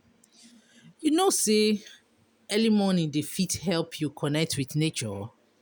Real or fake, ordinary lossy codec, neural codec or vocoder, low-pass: real; none; none; none